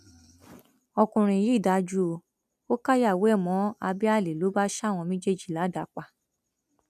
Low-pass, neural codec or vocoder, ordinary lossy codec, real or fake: 14.4 kHz; none; none; real